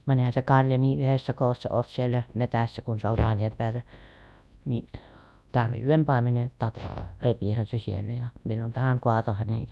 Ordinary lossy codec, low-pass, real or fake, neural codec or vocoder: none; none; fake; codec, 24 kHz, 0.9 kbps, WavTokenizer, large speech release